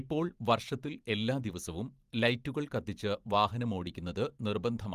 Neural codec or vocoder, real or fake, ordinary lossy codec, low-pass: vocoder, 44.1 kHz, 128 mel bands every 512 samples, BigVGAN v2; fake; Opus, 24 kbps; 14.4 kHz